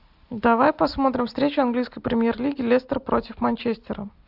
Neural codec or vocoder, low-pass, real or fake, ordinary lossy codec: none; 5.4 kHz; real; AAC, 48 kbps